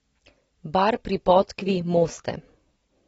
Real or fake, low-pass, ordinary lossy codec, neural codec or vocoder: real; 19.8 kHz; AAC, 24 kbps; none